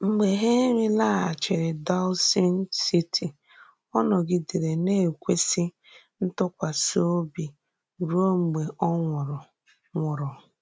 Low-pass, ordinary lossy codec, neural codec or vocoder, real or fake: none; none; none; real